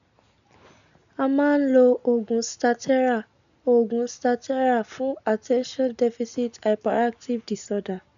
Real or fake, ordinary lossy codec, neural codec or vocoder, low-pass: real; none; none; 7.2 kHz